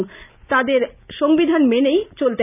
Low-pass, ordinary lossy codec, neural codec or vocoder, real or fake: 3.6 kHz; none; none; real